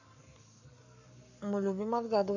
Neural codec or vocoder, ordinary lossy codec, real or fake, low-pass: codec, 44.1 kHz, 7.8 kbps, Pupu-Codec; none; fake; 7.2 kHz